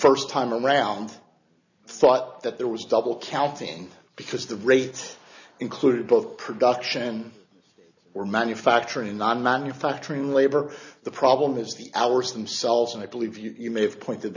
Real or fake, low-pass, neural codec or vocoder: real; 7.2 kHz; none